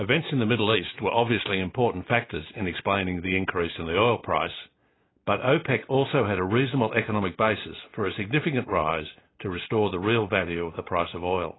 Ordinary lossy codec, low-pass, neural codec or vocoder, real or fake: AAC, 16 kbps; 7.2 kHz; none; real